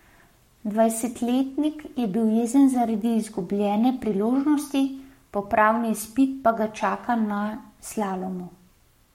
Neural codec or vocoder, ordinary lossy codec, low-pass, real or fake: codec, 44.1 kHz, 7.8 kbps, Pupu-Codec; MP3, 64 kbps; 19.8 kHz; fake